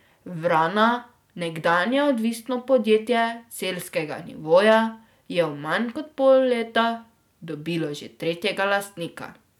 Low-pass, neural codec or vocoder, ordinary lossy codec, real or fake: 19.8 kHz; none; none; real